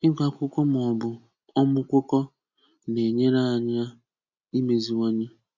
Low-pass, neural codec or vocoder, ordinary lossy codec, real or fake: 7.2 kHz; none; none; real